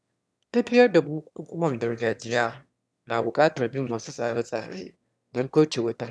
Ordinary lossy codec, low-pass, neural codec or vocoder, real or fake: none; none; autoencoder, 22.05 kHz, a latent of 192 numbers a frame, VITS, trained on one speaker; fake